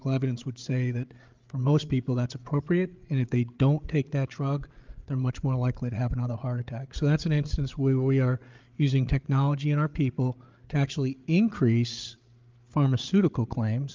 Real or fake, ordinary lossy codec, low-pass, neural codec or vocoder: fake; Opus, 24 kbps; 7.2 kHz; codec, 16 kHz, 8 kbps, FreqCodec, larger model